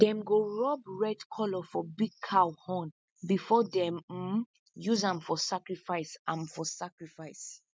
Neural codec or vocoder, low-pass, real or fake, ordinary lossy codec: none; none; real; none